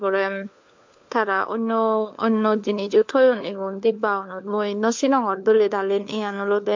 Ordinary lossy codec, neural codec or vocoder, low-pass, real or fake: MP3, 48 kbps; codec, 16 kHz, 4 kbps, FunCodec, trained on LibriTTS, 50 frames a second; 7.2 kHz; fake